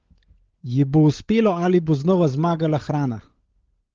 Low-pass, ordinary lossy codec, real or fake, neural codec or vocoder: 7.2 kHz; Opus, 16 kbps; fake; codec, 16 kHz, 16 kbps, FunCodec, trained on LibriTTS, 50 frames a second